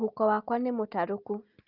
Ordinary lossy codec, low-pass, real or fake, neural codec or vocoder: Opus, 24 kbps; 5.4 kHz; real; none